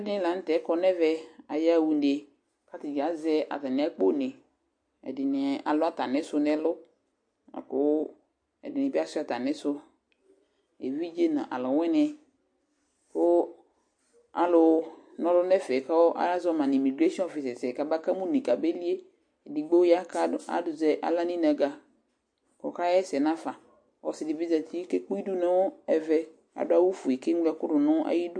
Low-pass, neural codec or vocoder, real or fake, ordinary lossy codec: 9.9 kHz; none; real; MP3, 48 kbps